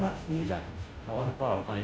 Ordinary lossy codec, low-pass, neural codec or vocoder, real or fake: none; none; codec, 16 kHz, 0.5 kbps, FunCodec, trained on Chinese and English, 25 frames a second; fake